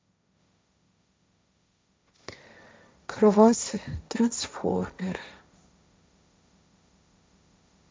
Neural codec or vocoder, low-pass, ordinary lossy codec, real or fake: codec, 16 kHz, 1.1 kbps, Voila-Tokenizer; none; none; fake